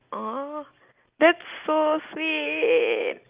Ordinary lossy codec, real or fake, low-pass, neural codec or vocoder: Opus, 32 kbps; real; 3.6 kHz; none